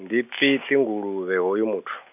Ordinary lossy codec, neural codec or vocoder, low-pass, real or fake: none; none; 3.6 kHz; real